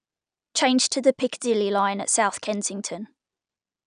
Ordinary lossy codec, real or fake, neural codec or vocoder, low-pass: none; real; none; 9.9 kHz